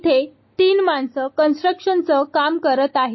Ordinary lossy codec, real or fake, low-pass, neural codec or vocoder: MP3, 24 kbps; real; 7.2 kHz; none